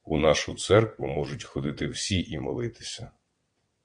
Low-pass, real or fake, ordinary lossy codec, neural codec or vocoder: 9.9 kHz; fake; MP3, 64 kbps; vocoder, 22.05 kHz, 80 mel bands, WaveNeXt